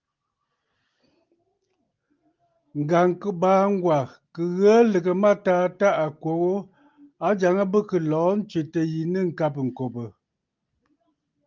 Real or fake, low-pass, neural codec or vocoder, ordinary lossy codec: real; 7.2 kHz; none; Opus, 32 kbps